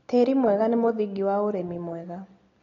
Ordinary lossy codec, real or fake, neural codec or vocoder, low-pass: AAC, 32 kbps; real; none; 7.2 kHz